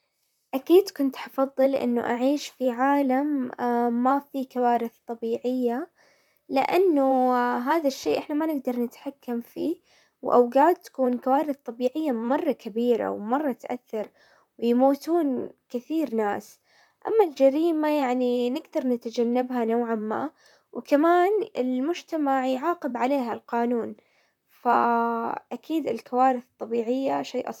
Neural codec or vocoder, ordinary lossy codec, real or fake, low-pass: vocoder, 44.1 kHz, 128 mel bands, Pupu-Vocoder; none; fake; 19.8 kHz